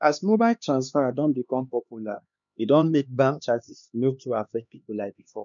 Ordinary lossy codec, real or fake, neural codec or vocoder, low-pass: none; fake; codec, 16 kHz, 2 kbps, X-Codec, HuBERT features, trained on LibriSpeech; 7.2 kHz